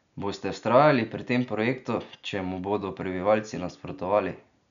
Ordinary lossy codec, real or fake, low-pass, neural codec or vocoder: none; real; 7.2 kHz; none